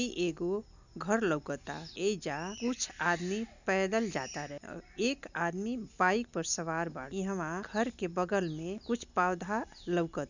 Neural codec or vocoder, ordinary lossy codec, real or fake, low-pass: none; none; real; 7.2 kHz